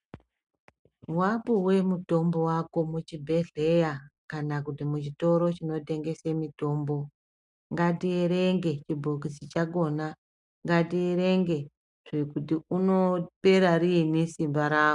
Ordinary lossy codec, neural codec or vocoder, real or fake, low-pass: MP3, 96 kbps; none; real; 10.8 kHz